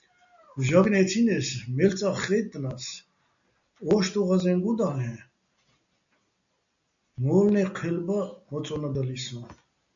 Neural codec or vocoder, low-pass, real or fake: none; 7.2 kHz; real